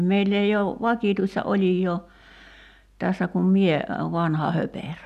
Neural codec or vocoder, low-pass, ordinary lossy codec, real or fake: none; 14.4 kHz; none; real